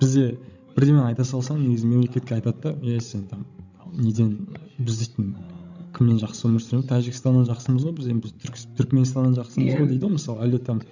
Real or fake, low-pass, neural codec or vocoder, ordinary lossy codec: fake; 7.2 kHz; codec, 16 kHz, 16 kbps, FreqCodec, larger model; none